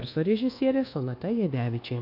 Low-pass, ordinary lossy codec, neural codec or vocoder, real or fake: 5.4 kHz; AAC, 32 kbps; codec, 24 kHz, 1.2 kbps, DualCodec; fake